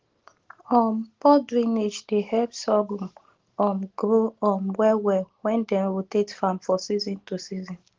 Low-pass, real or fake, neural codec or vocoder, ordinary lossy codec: 7.2 kHz; real; none; Opus, 16 kbps